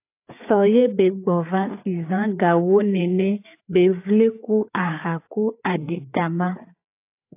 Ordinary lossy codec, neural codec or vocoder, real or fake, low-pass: AAC, 24 kbps; codec, 16 kHz, 4 kbps, FreqCodec, larger model; fake; 3.6 kHz